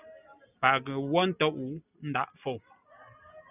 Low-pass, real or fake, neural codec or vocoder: 3.6 kHz; real; none